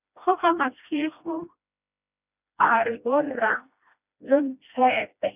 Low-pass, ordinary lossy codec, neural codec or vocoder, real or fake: 3.6 kHz; none; codec, 16 kHz, 1 kbps, FreqCodec, smaller model; fake